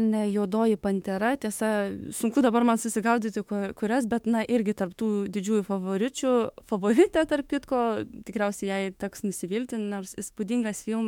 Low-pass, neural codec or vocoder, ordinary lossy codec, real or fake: 19.8 kHz; autoencoder, 48 kHz, 32 numbers a frame, DAC-VAE, trained on Japanese speech; MP3, 96 kbps; fake